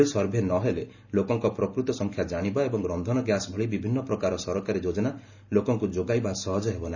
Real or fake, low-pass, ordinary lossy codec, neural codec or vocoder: real; 7.2 kHz; none; none